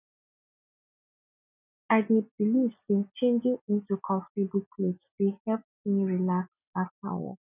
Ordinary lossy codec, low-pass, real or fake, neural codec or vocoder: none; 3.6 kHz; real; none